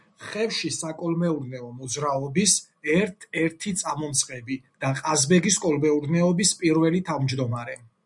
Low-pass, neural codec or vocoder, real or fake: 10.8 kHz; none; real